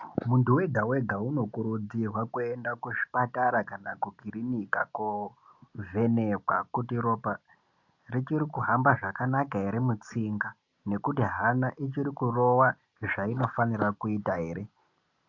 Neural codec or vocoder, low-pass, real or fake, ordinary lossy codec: none; 7.2 kHz; real; AAC, 48 kbps